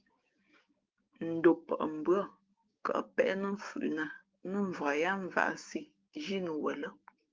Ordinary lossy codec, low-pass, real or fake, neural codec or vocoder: Opus, 32 kbps; 7.2 kHz; fake; codec, 16 kHz, 6 kbps, DAC